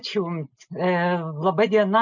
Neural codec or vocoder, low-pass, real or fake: none; 7.2 kHz; real